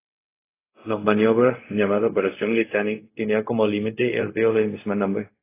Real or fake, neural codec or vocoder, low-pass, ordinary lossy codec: fake; codec, 16 kHz, 0.4 kbps, LongCat-Audio-Codec; 3.6 kHz; AAC, 24 kbps